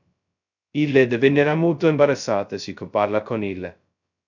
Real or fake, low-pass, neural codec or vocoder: fake; 7.2 kHz; codec, 16 kHz, 0.2 kbps, FocalCodec